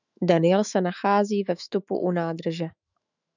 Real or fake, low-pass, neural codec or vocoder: fake; 7.2 kHz; autoencoder, 48 kHz, 128 numbers a frame, DAC-VAE, trained on Japanese speech